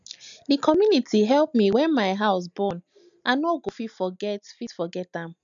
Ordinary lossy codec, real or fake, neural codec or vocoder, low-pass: none; real; none; 7.2 kHz